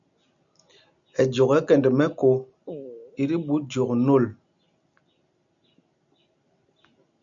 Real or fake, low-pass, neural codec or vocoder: real; 7.2 kHz; none